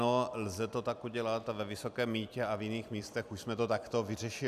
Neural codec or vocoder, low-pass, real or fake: vocoder, 44.1 kHz, 128 mel bands every 256 samples, BigVGAN v2; 14.4 kHz; fake